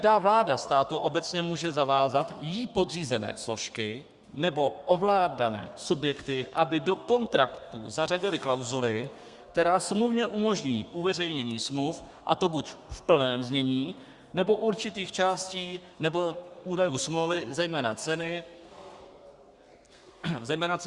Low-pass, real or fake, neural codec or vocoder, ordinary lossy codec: 10.8 kHz; fake; codec, 32 kHz, 1.9 kbps, SNAC; Opus, 64 kbps